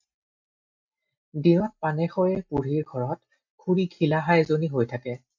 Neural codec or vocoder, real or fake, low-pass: none; real; 7.2 kHz